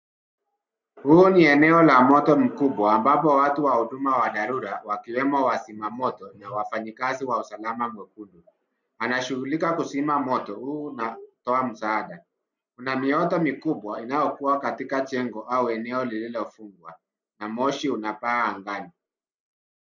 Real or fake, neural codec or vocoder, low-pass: real; none; 7.2 kHz